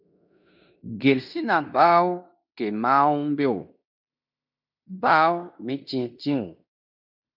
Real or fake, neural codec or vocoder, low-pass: fake; codec, 16 kHz in and 24 kHz out, 0.9 kbps, LongCat-Audio-Codec, fine tuned four codebook decoder; 5.4 kHz